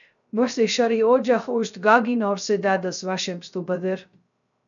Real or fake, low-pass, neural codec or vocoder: fake; 7.2 kHz; codec, 16 kHz, 0.3 kbps, FocalCodec